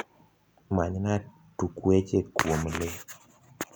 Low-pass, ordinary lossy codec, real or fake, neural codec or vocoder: none; none; real; none